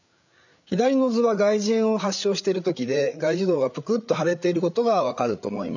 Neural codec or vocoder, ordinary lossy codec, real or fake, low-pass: codec, 16 kHz, 8 kbps, FreqCodec, larger model; none; fake; 7.2 kHz